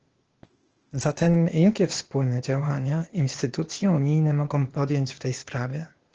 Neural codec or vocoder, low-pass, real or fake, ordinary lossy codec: codec, 16 kHz, 0.8 kbps, ZipCodec; 7.2 kHz; fake; Opus, 16 kbps